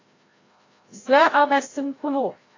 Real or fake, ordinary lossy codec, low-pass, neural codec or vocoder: fake; AAC, 32 kbps; 7.2 kHz; codec, 16 kHz, 0.5 kbps, FreqCodec, larger model